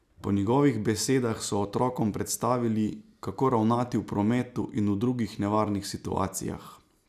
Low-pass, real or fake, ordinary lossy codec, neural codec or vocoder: 14.4 kHz; real; none; none